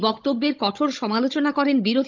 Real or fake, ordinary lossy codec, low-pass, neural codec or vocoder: fake; Opus, 24 kbps; 7.2 kHz; codec, 16 kHz, 16 kbps, FunCodec, trained on Chinese and English, 50 frames a second